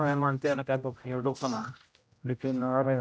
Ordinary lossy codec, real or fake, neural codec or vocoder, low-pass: none; fake; codec, 16 kHz, 0.5 kbps, X-Codec, HuBERT features, trained on general audio; none